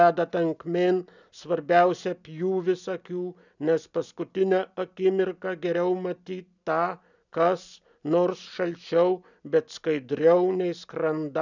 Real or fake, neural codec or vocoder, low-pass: real; none; 7.2 kHz